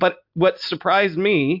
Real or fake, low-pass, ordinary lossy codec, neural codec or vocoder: real; 5.4 kHz; MP3, 48 kbps; none